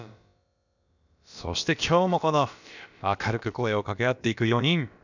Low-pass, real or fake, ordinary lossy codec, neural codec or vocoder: 7.2 kHz; fake; none; codec, 16 kHz, about 1 kbps, DyCAST, with the encoder's durations